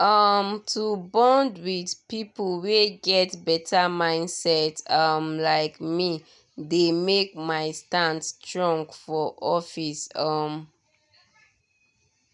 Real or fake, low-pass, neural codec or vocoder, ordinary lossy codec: real; 10.8 kHz; none; none